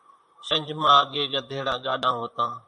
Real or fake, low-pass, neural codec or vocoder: fake; 10.8 kHz; vocoder, 44.1 kHz, 128 mel bands, Pupu-Vocoder